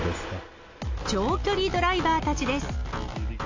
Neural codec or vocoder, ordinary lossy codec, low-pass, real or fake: none; AAC, 48 kbps; 7.2 kHz; real